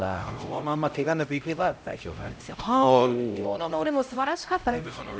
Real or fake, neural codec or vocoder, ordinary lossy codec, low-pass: fake; codec, 16 kHz, 0.5 kbps, X-Codec, HuBERT features, trained on LibriSpeech; none; none